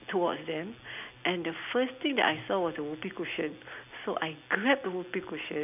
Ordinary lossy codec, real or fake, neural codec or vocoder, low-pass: none; real; none; 3.6 kHz